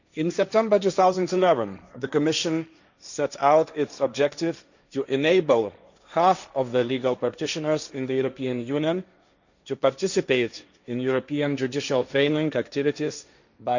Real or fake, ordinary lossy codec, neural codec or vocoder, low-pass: fake; none; codec, 16 kHz, 1.1 kbps, Voila-Tokenizer; 7.2 kHz